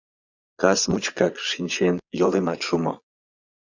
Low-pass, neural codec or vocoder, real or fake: 7.2 kHz; vocoder, 22.05 kHz, 80 mel bands, Vocos; fake